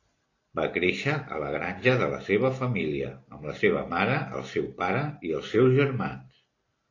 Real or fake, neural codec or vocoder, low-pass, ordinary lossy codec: real; none; 7.2 kHz; AAC, 32 kbps